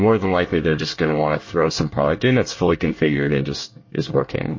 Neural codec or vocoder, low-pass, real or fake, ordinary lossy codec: codec, 24 kHz, 1 kbps, SNAC; 7.2 kHz; fake; MP3, 32 kbps